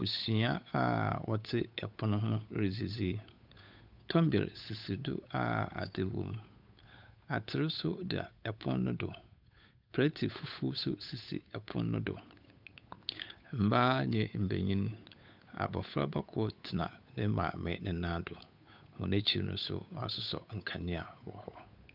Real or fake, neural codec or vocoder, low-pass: fake; codec, 16 kHz, 8 kbps, FunCodec, trained on Chinese and English, 25 frames a second; 5.4 kHz